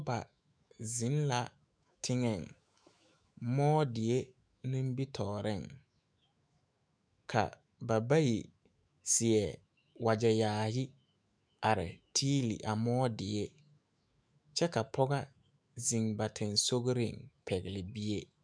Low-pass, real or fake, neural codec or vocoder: 9.9 kHz; fake; autoencoder, 48 kHz, 128 numbers a frame, DAC-VAE, trained on Japanese speech